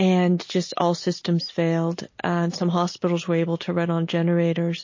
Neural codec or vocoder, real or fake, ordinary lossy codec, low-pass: none; real; MP3, 32 kbps; 7.2 kHz